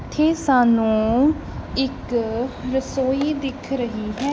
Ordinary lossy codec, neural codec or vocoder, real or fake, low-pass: none; none; real; none